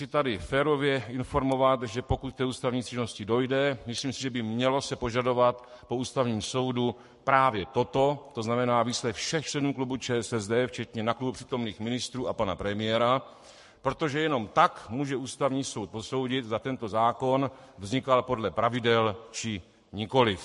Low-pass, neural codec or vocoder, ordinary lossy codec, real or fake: 14.4 kHz; codec, 44.1 kHz, 7.8 kbps, Pupu-Codec; MP3, 48 kbps; fake